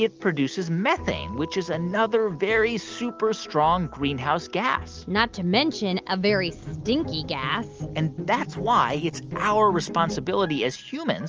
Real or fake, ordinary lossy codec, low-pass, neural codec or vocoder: real; Opus, 24 kbps; 7.2 kHz; none